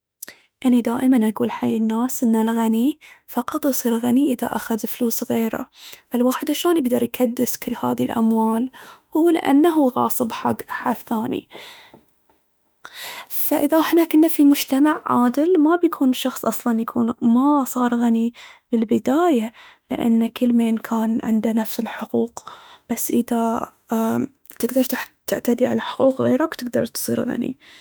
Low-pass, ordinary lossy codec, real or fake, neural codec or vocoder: none; none; fake; autoencoder, 48 kHz, 32 numbers a frame, DAC-VAE, trained on Japanese speech